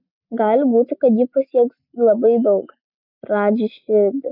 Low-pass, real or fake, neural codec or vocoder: 5.4 kHz; real; none